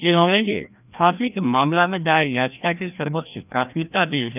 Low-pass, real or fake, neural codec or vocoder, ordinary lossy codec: 3.6 kHz; fake; codec, 16 kHz, 1 kbps, FreqCodec, larger model; none